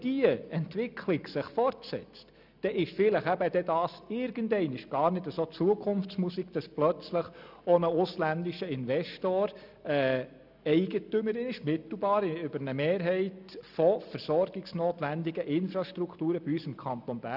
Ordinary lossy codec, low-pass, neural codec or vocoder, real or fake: none; 5.4 kHz; none; real